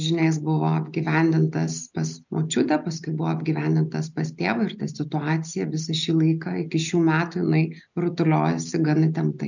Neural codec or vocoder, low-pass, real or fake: none; 7.2 kHz; real